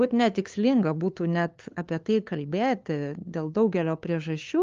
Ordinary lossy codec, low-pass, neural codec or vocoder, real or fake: Opus, 32 kbps; 7.2 kHz; codec, 16 kHz, 2 kbps, FunCodec, trained on LibriTTS, 25 frames a second; fake